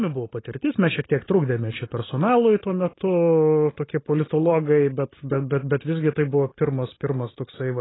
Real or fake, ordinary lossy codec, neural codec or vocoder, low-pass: real; AAC, 16 kbps; none; 7.2 kHz